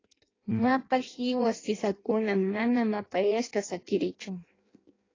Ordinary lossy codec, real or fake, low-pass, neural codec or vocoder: AAC, 32 kbps; fake; 7.2 kHz; codec, 16 kHz in and 24 kHz out, 0.6 kbps, FireRedTTS-2 codec